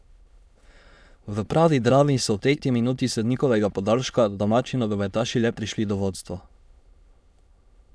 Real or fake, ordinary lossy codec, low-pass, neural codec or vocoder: fake; none; none; autoencoder, 22.05 kHz, a latent of 192 numbers a frame, VITS, trained on many speakers